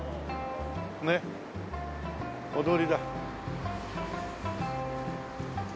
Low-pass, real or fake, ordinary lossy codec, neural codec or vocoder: none; real; none; none